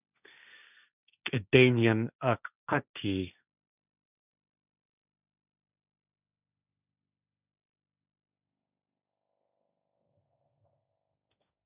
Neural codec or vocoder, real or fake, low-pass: codec, 16 kHz, 1.1 kbps, Voila-Tokenizer; fake; 3.6 kHz